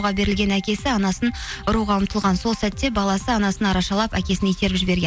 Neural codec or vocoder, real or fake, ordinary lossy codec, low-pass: none; real; none; none